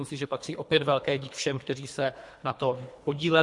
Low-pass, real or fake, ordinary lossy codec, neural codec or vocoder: 10.8 kHz; fake; MP3, 64 kbps; codec, 24 kHz, 3 kbps, HILCodec